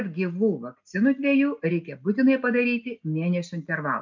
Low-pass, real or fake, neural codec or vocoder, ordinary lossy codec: 7.2 kHz; real; none; MP3, 64 kbps